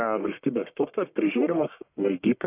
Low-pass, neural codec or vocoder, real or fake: 3.6 kHz; codec, 44.1 kHz, 1.7 kbps, Pupu-Codec; fake